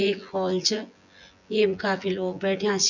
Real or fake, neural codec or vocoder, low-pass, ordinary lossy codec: fake; vocoder, 24 kHz, 100 mel bands, Vocos; 7.2 kHz; none